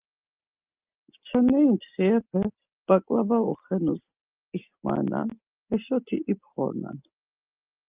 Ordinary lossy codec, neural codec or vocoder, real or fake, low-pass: Opus, 24 kbps; none; real; 3.6 kHz